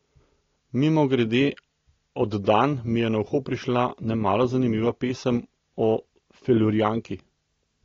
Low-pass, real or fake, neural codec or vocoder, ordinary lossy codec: 7.2 kHz; real; none; AAC, 32 kbps